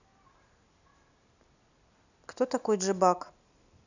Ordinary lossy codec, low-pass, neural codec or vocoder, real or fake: none; 7.2 kHz; none; real